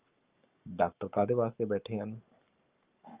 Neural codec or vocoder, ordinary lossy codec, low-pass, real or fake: codec, 44.1 kHz, 7.8 kbps, Pupu-Codec; Opus, 32 kbps; 3.6 kHz; fake